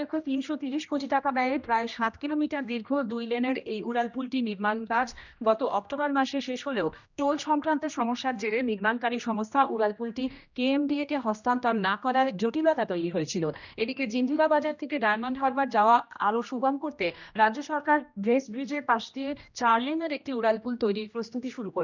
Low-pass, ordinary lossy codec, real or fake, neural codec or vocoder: 7.2 kHz; none; fake; codec, 16 kHz, 1 kbps, X-Codec, HuBERT features, trained on general audio